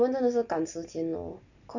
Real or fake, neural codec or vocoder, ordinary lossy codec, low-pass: real; none; none; 7.2 kHz